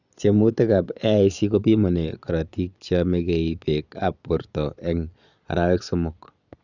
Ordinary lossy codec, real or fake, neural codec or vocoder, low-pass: none; fake; vocoder, 22.05 kHz, 80 mel bands, Vocos; 7.2 kHz